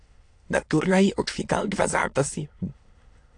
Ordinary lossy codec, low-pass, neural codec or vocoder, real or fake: AAC, 48 kbps; 9.9 kHz; autoencoder, 22.05 kHz, a latent of 192 numbers a frame, VITS, trained on many speakers; fake